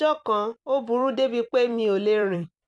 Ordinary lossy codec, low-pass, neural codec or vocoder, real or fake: none; 10.8 kHz; vocoder, 44.1 kHz, 128 mel bands every 256 samples, BigVGAN v2; fake